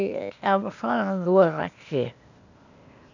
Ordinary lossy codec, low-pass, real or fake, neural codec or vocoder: none; 7.2 kHz; fake; codec, 16 kHz, 0.8 kbps, ZipCodec